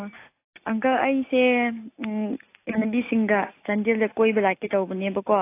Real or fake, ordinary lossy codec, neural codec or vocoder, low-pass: real; AAC, 24 kbps; none; 3.6 kHz